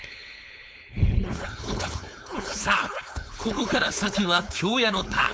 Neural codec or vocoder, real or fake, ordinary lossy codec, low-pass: codec, 16 kHz, 4.8 kbps, FACodec; fake; none; none